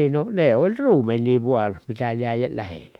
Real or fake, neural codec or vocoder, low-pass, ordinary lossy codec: fake; autoencoder, 48 kHz, 32 numbers a frame, DAC-VAE, trained on Japanese speech; 19.8 kHz; none